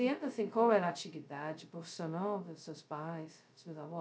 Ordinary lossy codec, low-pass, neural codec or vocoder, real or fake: none; none; codec, 16 kHz, 0.2 kbps, FocalCodec; fake